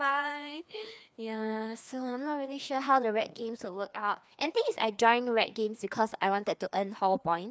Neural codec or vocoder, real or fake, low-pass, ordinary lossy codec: codec, 16 kHz, 2 kbps, FreqCodec, larger model; fake; none; none